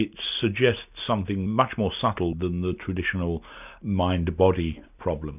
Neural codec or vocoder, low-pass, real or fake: none; 3.6 kHz; real